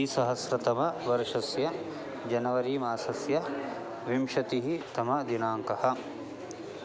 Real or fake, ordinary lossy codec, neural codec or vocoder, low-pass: real; none; none; none